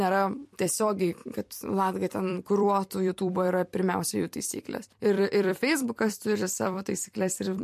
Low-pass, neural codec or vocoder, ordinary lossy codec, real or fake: 14.4 kHz; vocoder, 44.1 kHz, 128 mel bands every 256 samples, BigVGAN v2; MP3, 64 kbps; fake